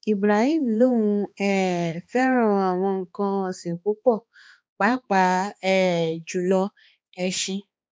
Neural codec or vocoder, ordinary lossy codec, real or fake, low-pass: codec, 16 kHz, 2 kbps, X-Codec, HuBERT features, trained on balanced general audio; none; fake; none